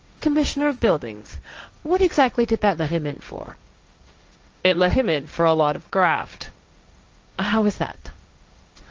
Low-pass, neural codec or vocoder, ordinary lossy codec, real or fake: 7.2 kHz; codec, 16 kHz, 1.1 kbps, Voila-Tokenizer; Opus, 24 kbps; fake